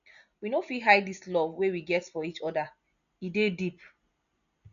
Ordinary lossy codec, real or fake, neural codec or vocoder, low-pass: none; real; none; 7.2 kHz